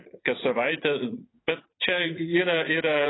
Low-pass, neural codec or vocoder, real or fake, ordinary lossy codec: 7.2 kHz; vocoder, 44.1 kHz, 80 mel bands, Vocos; fake; AAC, 16 kbps